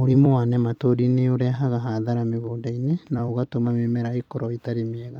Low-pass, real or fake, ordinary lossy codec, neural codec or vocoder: 19.8 kHz; fake; none; vocoder, 44.1 kHz, 128 mel bands every 256 samples, BigVGAN v2